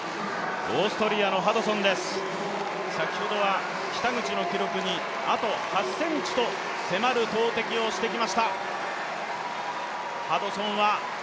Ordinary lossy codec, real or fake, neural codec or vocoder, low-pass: none; real; none; none